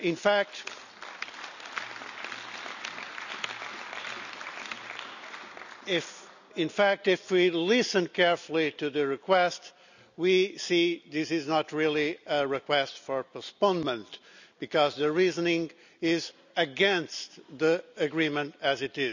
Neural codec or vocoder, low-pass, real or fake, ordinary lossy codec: none; 7.2 kHz; real; none